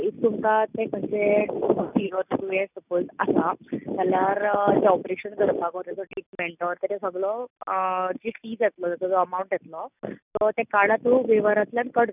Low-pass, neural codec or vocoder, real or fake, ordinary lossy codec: 3.6 kHz; none; real; none